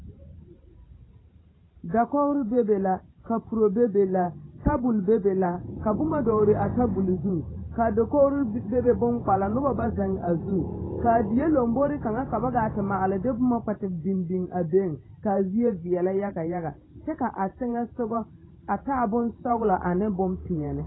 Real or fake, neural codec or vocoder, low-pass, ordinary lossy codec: fake; vocoder, 44.1 kHz, 128 mel bands every 512 samples, BigVGAN v2; 7.2 kHz; AAC, 16 kbps